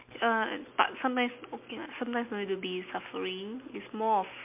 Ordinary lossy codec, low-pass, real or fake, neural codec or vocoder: MP3, 32 kbps; 3.6 kHz; real; none